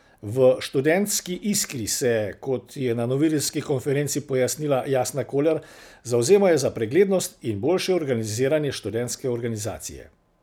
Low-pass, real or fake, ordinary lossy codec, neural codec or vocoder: none; real; none; none